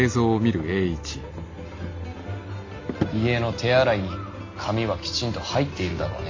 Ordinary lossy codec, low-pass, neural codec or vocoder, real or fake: MP3, 48 kbps; 7.2 kHz; none; real